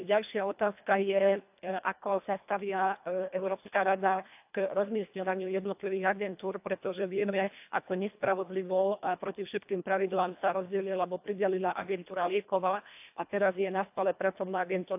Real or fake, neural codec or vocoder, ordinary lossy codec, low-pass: fake; codec, 24 kHz, 1.5 kbps, HILCodec; AAC, 32 kbps; 3.6 kHz